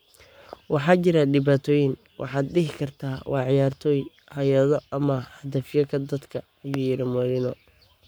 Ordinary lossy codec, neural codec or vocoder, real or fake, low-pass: none; codec, 44.1 kHz, 7.8 kbps, Pupu-Codec; fake; none